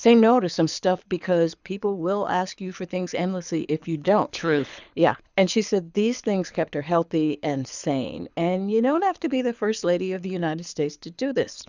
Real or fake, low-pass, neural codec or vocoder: fake; 7.2 kHz; codec, 24 kHz, 6 kbps, HILCodec